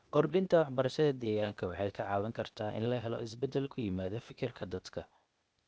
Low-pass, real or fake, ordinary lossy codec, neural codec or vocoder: none; fake; none; codec, 16 kHz, 0.8 kbps, ZipCodec